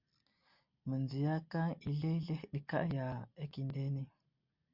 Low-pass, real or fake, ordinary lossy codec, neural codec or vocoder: 5.4 kHz; real; MP3, 48 kbps; none